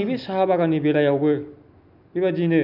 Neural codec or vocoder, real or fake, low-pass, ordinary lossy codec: none; real; 5.4 kHz; none